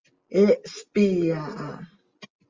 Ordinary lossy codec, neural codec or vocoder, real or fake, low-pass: Opus, 32 kbps; none; real; 7.2 kHz